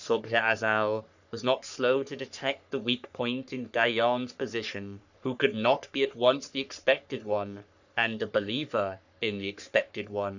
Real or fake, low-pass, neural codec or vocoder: fake; 7.2 kHz; codec, 44.1 kHz, 3.4 kbps, Pupu-Codec